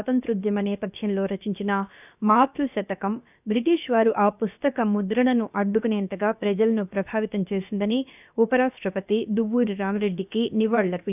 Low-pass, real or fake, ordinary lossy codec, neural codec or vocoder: 3.6 kHz; fake; none; codec, 16 kHz, about 1 kbps, DyCAST, with the encoder's durations